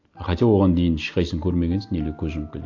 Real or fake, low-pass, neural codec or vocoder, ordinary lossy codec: real; 7.2 kHz; none; AAC, 48 kbps